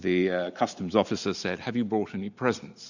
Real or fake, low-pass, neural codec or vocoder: real; 7.2 kHz; none